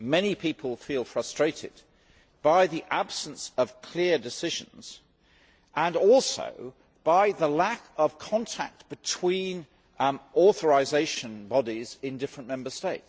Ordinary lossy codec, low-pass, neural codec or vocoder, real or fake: none; none; none; real